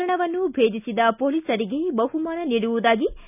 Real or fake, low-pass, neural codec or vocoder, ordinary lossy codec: real; 3.6 kHz; none; none